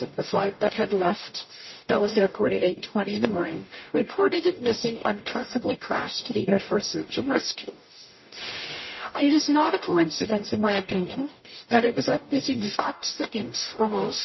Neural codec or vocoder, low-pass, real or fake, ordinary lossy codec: codec, 44.1 kHz, 0.9 kbps, DAC; 7.2 kHz; fake; MP3, 24 kbps